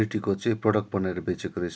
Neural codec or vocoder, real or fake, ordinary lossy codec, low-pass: none; real; none; none